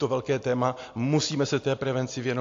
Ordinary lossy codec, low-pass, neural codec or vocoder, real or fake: AAC, 48 kbps; 7.2 kHz; none; real